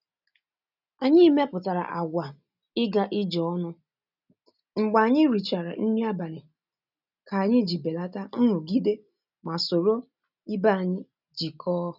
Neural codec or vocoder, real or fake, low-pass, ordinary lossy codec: none; real; 5.4 kHz; none